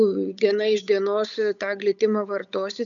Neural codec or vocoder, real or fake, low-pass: codec, 16 kHz, 8 kbps, FunCodec, trained on Chinese and English, 25 frames a second; fake; 7.2 kHz